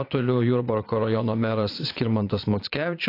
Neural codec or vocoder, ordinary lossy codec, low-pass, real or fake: vocoder, 22.05 kHz, 80 mel bands, Vocos; AAC, 32 kbps; 5.4 kHz; fake